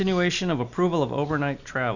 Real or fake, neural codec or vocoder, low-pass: real; none; 7.2 kHz